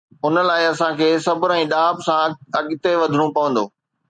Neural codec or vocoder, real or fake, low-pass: none; real; 9.9 kHz